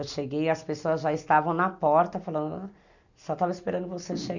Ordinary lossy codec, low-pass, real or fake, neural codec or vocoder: none; 7.2 kHz; real; none